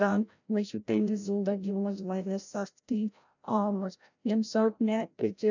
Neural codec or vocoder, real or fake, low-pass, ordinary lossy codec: codec, 16 kHz, 0.5 kbps, FreqCodec, larger model; fake; 7.2 kHz; none